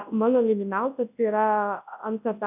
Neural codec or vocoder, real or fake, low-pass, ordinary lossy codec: codec, 24 kHz, 0.9 kbps, WavTokenizer, large speech release; fake; 3.6 kHz; AAC, 32 kbps